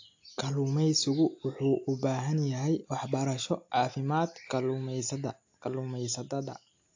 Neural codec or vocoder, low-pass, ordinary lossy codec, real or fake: none; 7.2 kHz; AAC, 48 kbps; real